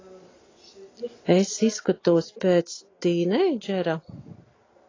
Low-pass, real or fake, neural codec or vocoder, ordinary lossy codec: 7.2 kHz; real; none; AAC, 32 kbps